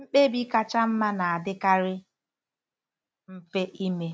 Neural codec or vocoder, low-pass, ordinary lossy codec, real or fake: none; none; none; real